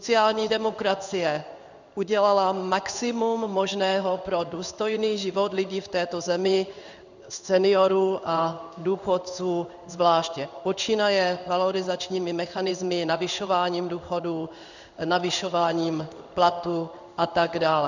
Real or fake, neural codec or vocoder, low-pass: fake; codec, 16 kHz in and 24 kHz out, 1 kbps, XY-Tokenizer; 7.2 kHz